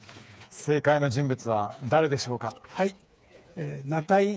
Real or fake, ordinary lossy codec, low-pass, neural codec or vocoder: fake; none; none; codec, 16 kHz, 4 kbps, FreqCodec, smaller model